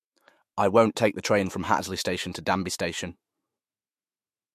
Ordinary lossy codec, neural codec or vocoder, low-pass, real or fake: MP3, 64 kbps; autoencoder, 48 kHz, 128 numbers a frame, DAC-VAE, trained on Japanese speech; 14.4 kHz; fake